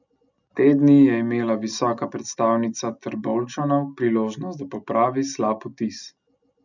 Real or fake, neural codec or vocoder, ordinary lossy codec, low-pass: real; none; none; 7.2 kHz